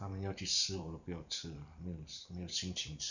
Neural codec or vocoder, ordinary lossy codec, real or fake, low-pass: vocoder, 44.1 kHz, 128 mel bands every 512 samples, BigVGAN v2; none; fake; 7.2 kHz